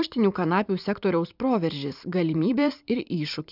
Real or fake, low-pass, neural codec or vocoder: fake; 5.4 kHz; vocoder, 44.1 kHz, 128 mel bands every 512 samples, BigVGAN v2